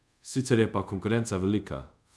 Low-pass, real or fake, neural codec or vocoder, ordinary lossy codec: none; fake; codec, 24 kHz, 0.5 kbps, DualCodec; none